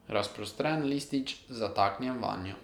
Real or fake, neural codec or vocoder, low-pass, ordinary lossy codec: real; none; 19.8 kHz; none